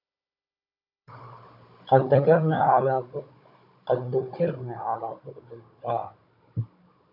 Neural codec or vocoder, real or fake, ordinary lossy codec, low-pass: codec, 16 kHz, 16 kbps, FunCodec, trained on Chinese and English, 50 frames a second; fake; AAC, 48 kbps; 5.4 kHz